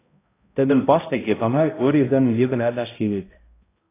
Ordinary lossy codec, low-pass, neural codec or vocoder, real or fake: AAC, 16 kbps; 3.6 kHz; codec, 16 kHz, 0.5 kbps, X-Codec, HuBERT features, trained on balanced general audio; fake